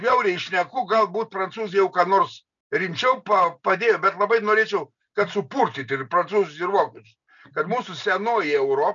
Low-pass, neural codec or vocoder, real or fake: 7.2 kHz; none; real